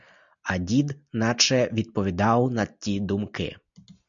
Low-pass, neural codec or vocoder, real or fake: 7.2 kHz; none; real